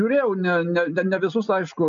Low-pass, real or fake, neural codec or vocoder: 7.2 kHz; real; none